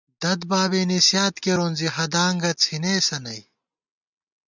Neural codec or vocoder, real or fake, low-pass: none; real; 7.2 kHz